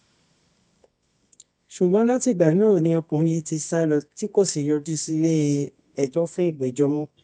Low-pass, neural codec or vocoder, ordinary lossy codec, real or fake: 10.8 kHz; codec, 24 kHz, 0.9 kbps, WavTokenizer, medium music audio release; none; fake